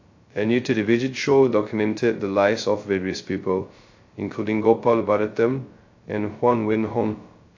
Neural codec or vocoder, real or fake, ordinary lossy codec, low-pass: codec, 16 kHz, 0.2 kbps, FocalCodec; fake; AAC, 48 kbps; 7.2 kHz